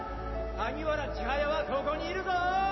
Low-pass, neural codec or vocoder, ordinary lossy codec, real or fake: 7.2 kHz; none; MP3, 24 kbps; real